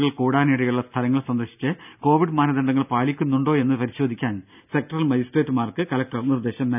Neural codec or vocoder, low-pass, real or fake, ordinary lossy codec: none; 3.6 kHz; real; none